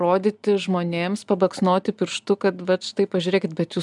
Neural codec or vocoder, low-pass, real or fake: none; 10.8 kHz; real